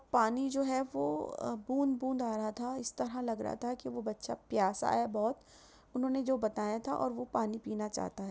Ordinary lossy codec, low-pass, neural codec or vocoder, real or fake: none; none; none; real